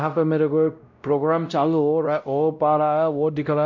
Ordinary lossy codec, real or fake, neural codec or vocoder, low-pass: none; fake; codec, 16 kHz, 0.5 kbps, X-Codec, WavLM features, trained on Multilingual LibriSpeech; 7.2 kHz